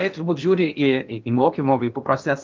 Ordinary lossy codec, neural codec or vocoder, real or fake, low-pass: Opus, 24 kbps; codec, 16 kHz in and 24 kHz out, 0.8 kbps, FocalCodec, streaming, 65536 codes; fake; 7.2 kHz